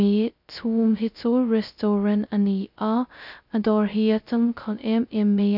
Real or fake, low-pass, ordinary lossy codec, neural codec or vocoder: fake; 5.4 kHz; none; codec, 16 kHz, 0.2 kbps, FocalCodec